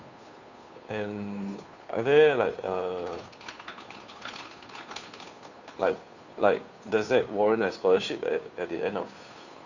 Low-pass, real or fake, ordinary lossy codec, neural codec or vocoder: 7.2 kHz; fake; none; codec, 16 kHz, 2 kbps, FunCodec, trained on Chinese and English, 25 frames a second